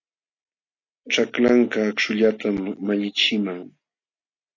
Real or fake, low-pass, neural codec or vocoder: real; 7.2 kHz; none